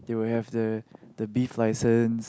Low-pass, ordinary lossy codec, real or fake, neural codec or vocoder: none; none; real; none